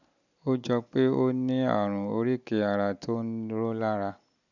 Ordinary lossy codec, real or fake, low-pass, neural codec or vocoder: none; real; 7.2 kHz; none